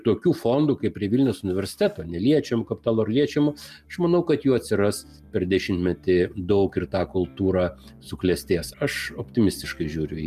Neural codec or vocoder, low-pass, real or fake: none; 14.4 kHz; real